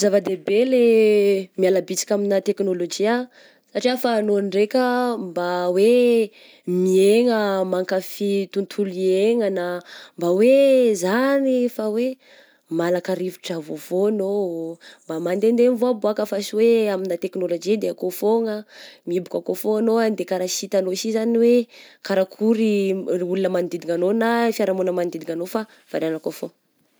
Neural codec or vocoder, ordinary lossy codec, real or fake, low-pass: none; none; real; none